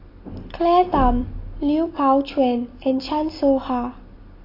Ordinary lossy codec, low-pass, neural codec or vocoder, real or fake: AAC, 24 kbps; 5.4 kHz; none; real